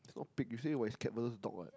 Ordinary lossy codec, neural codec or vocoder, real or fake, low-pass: none; none; real; none